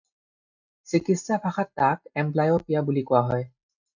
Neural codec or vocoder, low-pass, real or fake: none; 7.2 kHz; real